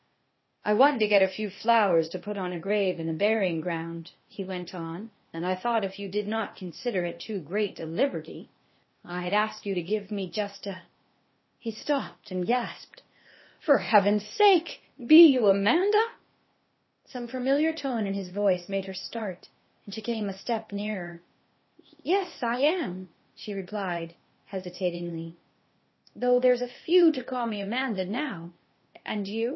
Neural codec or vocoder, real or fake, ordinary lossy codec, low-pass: codec, 16 kHz, 0.8 kbps, ZipCodec; fake; MP3, 24 kbps; 7.2 kHz